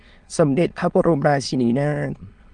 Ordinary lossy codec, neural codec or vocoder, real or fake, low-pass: Opus, 32 kbps; autoencoder, 22.05 kHz, a latent of 192 numbers a frame, VITS, trained on many speakers; fake; 9.9 kHz